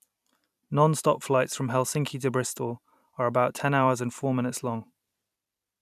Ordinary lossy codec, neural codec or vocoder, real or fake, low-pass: none; vocoder, 44.1 kHz, 128 mel bands every 256 samples, BigVGAN v2; fake; 14.4 kHz